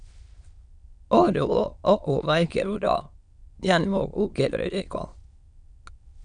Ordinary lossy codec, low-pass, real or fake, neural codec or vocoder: Opus, 64 kbps; 9.9 kHz; fake; autoencoder, 22.05 kHz, a latent of 192 numbers a frame, VITS, trained on many speakers